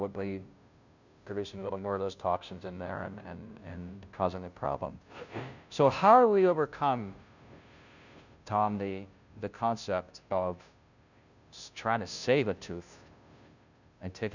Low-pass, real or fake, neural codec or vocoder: 7.2 kHz; fake; codec, 16 kHz, 0.5 kbps, FunCodec, trained on Chinese and English, 25 frames a second